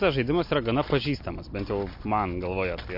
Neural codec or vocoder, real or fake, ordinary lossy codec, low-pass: none; real; MP3, 32 kbps; 5.4 kHz